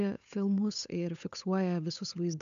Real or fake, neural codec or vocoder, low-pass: fake; codec, 16 kHz, 8 kbps, FunCodec, trained on Chinese and English, 25 frames a second; 7.2 kHz